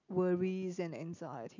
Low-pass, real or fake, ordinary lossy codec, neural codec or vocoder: 7.2 kHz; real; none; none